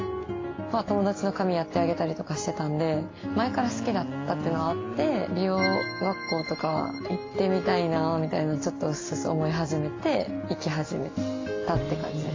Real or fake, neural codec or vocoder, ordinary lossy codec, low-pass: real; none; AAC, 32 kbps; 7.2 kHz